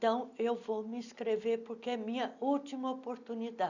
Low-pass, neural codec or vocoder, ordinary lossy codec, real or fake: 7.2 kHz; none; none; real